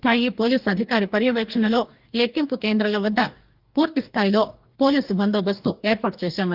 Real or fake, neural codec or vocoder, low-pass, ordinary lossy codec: fake; codec, 44.1 kHz, 2.6 kbps, DAC; 5.4 kHz; Opus, 32 kbps